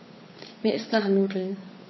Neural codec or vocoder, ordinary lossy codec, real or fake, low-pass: codec, 16 kHz, 8 kbps, FunCodec, trained on Chinese and English, 25 frames a second; MP3, 24 kbps; fake; 7.2 kHz